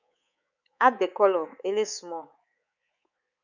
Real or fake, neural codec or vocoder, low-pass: fake; codec, 24 kHz, 3.1 kbps, DualCodec; 7.2 kHz